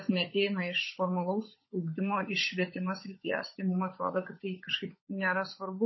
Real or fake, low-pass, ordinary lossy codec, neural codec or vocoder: fake; 7.2 kHz; MP3, 24 kbps; codec, 16 kHz, 16 kbps, FunCodec, trained on LibriTTS, 50 frames a second